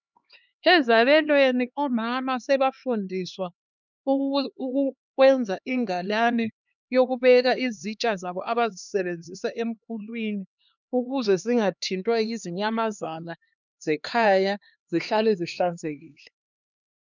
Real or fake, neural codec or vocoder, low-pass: fake; codec, 16 kHz, 2 kbps, X-Codec, HuBERT features, trained on LibriSpeech; 7.2 kHz